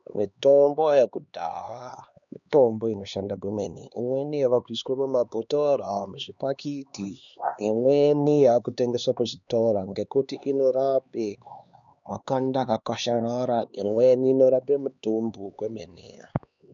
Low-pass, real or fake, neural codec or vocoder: 7.2 kHz; fake; codec, 16 kHz, 2 kbps, X-Codec, HuBERT features, trained on LibriSpeech